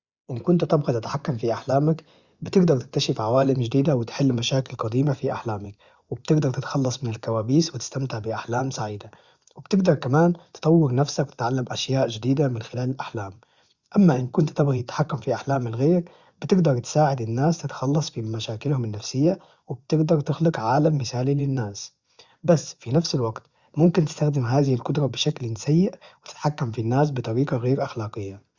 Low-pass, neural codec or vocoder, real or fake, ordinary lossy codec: 7.2 kHz; vocoder, 24 kHz, 100 mel bands, Vocos; fake; Opus, 64 kbps